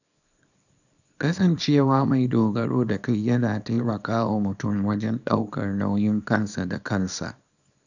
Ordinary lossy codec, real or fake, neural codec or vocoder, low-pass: none; fake; codec, 24 kHz, 0.9 kbps, WavTokenizer, small release; 7.2 kHz